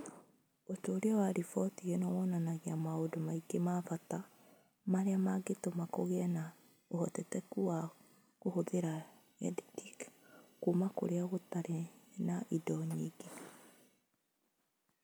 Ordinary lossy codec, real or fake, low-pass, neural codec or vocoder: none; real; none; none